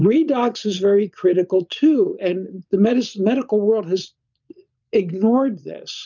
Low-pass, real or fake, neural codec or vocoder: 7.2 kHz; fake; vocoder, 22.05 kHz, 80 mel bands, Vocos